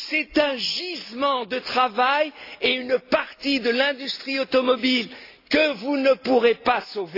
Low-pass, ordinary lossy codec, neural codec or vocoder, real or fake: 5.4 kHz; AAC, 32 kbps; none; real